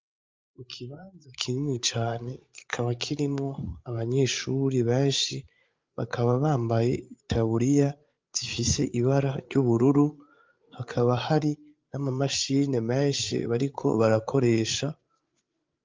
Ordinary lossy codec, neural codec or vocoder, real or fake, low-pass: Opus, 32 kbps; codec, 16 kHz, 8 kbps, FreqCodec, larger model; fake; 7.2 kHz